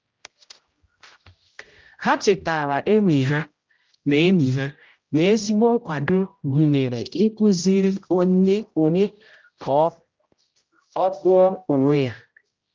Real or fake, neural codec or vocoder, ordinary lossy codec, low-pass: fake; codec, 16 kHz, 0.5 kbps, X-Codec, HuBERT features, trained on general audio; Opus, 32 kbps; 7.2 kHz